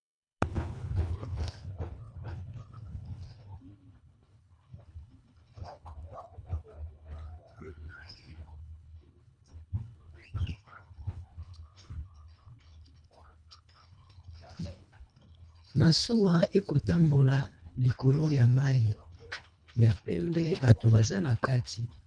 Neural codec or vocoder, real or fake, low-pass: codec, 24 kHz, 1.5 kbps, HILCodec; fake; 9.9 kHz